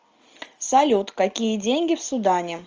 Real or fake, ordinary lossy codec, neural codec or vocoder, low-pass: real; Opus, 24 kbps; none; 7.2 kHz